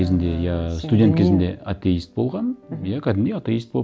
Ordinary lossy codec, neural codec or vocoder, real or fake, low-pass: none; none; real; none